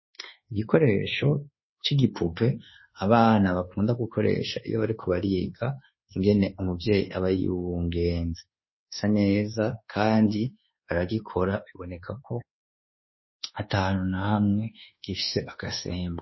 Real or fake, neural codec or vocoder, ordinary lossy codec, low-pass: fake; codec, 24 kHz, 1.2 kbps, DualCodec; MP3, 24 kbps; 7.2 kHz